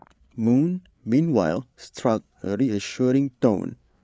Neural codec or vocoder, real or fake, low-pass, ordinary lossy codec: codec, 16 kHz, 8 kbps, FreqCodec, larger model; fake; none; none